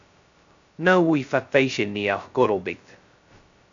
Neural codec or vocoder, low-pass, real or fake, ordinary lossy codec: codec, 16 kHz, 0.2 kbps, FocalCodec; 7.2 kHz; fake; AAC, 64 kbps